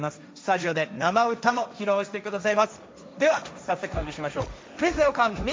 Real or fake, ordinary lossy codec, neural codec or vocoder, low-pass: fake; none; codec, 16 kHz, 1.1 kbps, Voila-Tokenizer; none